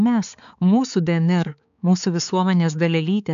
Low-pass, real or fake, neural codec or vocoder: 7.2 kHz; fake; codec, 16 kHz, 4 kbps, X-Codec, HuBERT features, trained on balanced general audio